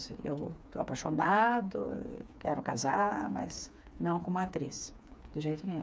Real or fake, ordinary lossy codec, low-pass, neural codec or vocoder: fake; none; none; codec, 16 kHz, 4 kbps, FreqCodec, smaller model